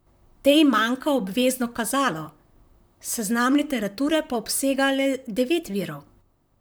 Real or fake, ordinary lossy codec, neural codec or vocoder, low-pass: fake; none; vocoder, 44.1 kHz, 128 mel bands, Pupu-Vocoder; none